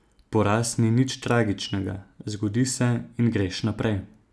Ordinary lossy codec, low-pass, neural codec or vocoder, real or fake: none; none; none; real